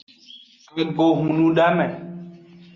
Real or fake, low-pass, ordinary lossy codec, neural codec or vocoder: real; 7.2 kHz; Opus, 64 kbps; none